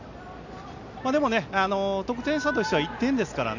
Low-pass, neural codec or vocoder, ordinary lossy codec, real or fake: 7.2 kHz; none; none; real